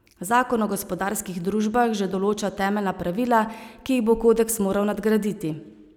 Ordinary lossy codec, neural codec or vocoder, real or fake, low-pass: none; none; real; 19.8 kHz